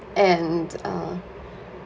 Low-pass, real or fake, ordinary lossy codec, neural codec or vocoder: none; real; none; none